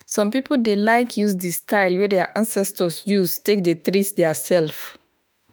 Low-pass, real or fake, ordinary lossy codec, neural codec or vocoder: none; fake; none; autoencoder, 48 kHz, 32 numbers a frame, DAC-VAE, trained on Japanese speech